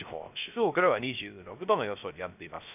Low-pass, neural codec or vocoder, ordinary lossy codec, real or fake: 3.6 kHz; codec, 16 kHz, 0.3 kbps, FocalCodec; none; fake